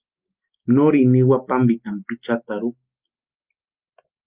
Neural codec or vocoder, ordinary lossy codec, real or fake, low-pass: none; Opus, 24 kbps; real; 3.6 kHz